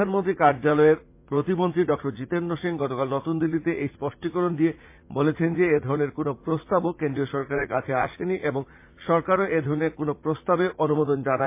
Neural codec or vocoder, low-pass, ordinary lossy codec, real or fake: vocoder, 44.1 kHz, 80 mel bands, Vocos; 3.6 kHz; MP3, 24 kbps; fake